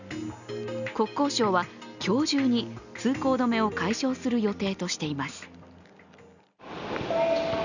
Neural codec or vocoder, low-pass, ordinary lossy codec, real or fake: none; 7.2 kHz; none; real